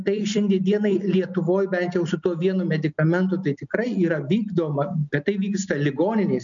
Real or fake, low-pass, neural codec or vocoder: real; 7.2 kHz; none